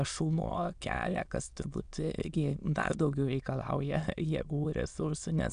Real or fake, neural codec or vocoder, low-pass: fake; autoencoder, 22.05 kHz, a latent of 192 numbers a frame, VITS, trained on many speakers; 9.9 kHz